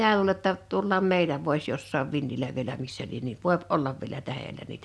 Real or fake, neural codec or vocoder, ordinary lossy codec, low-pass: real; none; none; none